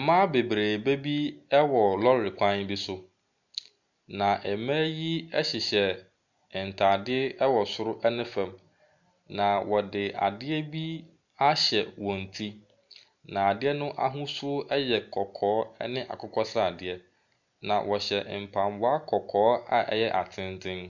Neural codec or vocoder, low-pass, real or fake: none; 7.2 kHz; real